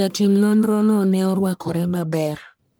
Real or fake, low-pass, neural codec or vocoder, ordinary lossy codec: fake; none; codec, 44.1 kHz, 1.7 kbps, Pupu-Codec; none